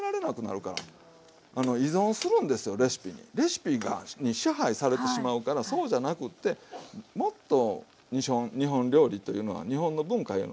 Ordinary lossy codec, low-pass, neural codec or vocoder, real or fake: none; none; none; real